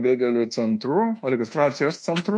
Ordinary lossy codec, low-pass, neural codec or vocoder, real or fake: MP3, 48 kbps; 10.8 kHz; codec, 24 kHz, 1.2 kbps, DualCodec; fake